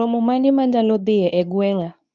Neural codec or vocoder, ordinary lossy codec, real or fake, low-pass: codec, 24 kHz, 0.9 kbps, WavTokenizer, medium speech release version 2; none; fake; 9.9 kHz